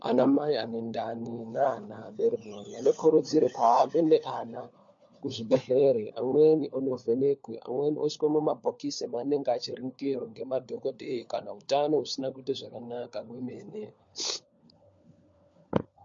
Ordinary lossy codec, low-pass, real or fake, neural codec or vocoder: MP3, 48 kbps; 7.2 kHz; fake; codec, 16 kHz, 4 kbps, FunCodec, trained on LibriTTS, 50 frames a second